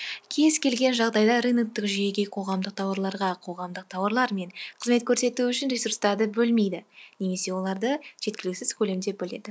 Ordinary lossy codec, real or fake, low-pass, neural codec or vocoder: none; real; none; none